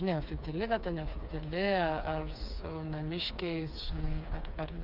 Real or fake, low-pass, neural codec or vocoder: fake; 5.4 kHz; codec, 16 kHz, 4 kbps, FreqCodec, smaller model